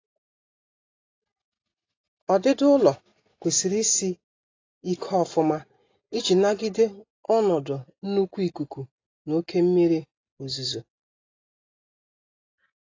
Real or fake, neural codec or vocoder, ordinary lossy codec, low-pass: real; none; AAC, 32 kbps; 7.2 kHz